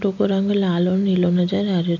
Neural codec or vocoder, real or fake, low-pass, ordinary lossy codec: none; real; 7.2 kHz; none